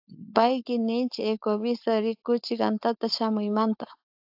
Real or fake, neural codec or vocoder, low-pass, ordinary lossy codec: fake; codec, 16 kHz, 4.8 kbps, FACodec; 5.4 kHz; AAC, 48 kbps